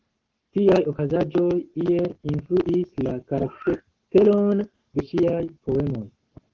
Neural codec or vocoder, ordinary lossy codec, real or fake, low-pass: codec, 44.1 kHz, 7.8 kbps, Pupu-Codec; Opus, 16 kbps; fake; 7.2 kHz